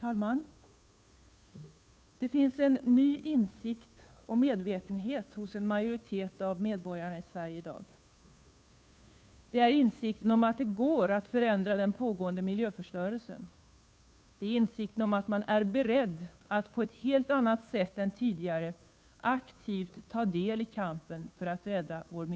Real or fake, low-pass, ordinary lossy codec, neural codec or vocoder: fake; none; none; codec, 16 kHz, 2 kbps, FunCodec, trained on Chinese and English, 25 frames a second